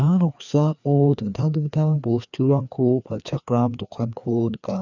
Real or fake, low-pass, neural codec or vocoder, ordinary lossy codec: fake; 7.2 kHz; codec, 16 kHz, 2 kbps, FreqCodec, larger model; none